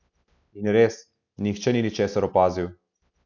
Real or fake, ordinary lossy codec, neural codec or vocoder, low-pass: real; none; none; 7.2 kHz